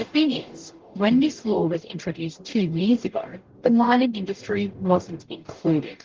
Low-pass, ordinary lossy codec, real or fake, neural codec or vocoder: 7.2 kHz; Opus, 16 kbps; fake; codec, 44.1 kHz, 0.9 kbps, DAC